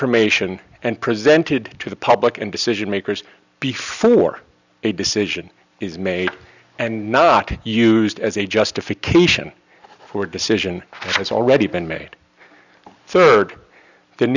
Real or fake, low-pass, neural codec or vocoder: real; 7.2 kHz; none